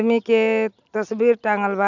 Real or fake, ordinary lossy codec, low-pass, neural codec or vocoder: real; none; 7.2 kHz; none